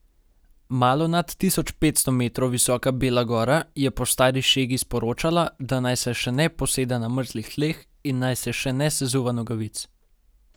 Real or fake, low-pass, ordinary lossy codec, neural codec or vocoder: real; none; none; none